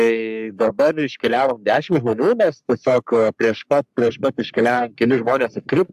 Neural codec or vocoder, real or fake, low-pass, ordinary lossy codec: codec, 44.1 kHz, 3.4 kbps, Pupu-Codec; fake; 14.4 kHz; AAC, 96 kbps